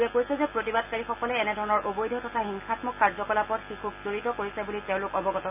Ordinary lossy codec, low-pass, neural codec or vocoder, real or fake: none; 3.6 kHz; none; real